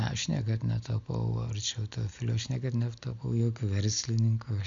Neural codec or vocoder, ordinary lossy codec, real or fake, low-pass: none; MP3, 64 kbps; real; 7.2 kHz